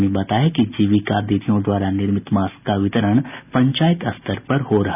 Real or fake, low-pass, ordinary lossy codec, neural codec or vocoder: real; 3.6 kHz; none; none